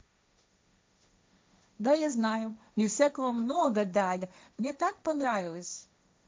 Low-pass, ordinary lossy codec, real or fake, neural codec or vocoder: none; none; fake; codec, 16 kHz, 1.1 kbps, Voila-Tokenizer